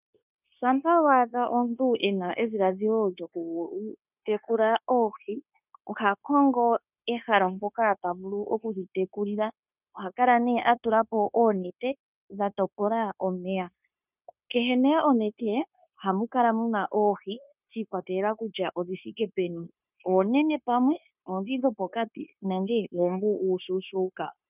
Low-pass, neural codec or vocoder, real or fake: 3.6 kHz; codec, 16 kHz, 0.9 kbps, LongCat-Audio-Codec; fake